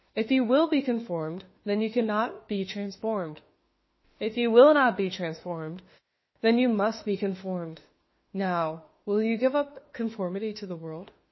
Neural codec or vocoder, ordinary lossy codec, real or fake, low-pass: autoencoder, 48 kHz, 32 numbers a frame, DAC-VAE, trained on Japanese speech; MP3, 24 kbps; fake; 7.2 kHz